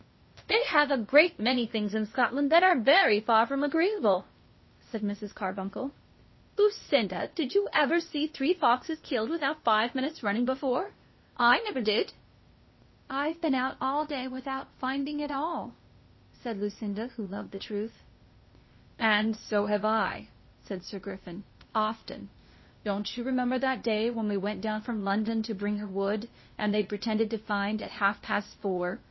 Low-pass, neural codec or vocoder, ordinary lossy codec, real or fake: 7.2 kHz; codec, 16 kHz, 0.8 kbps, ZipCodec; MP3, 24 kbps; fake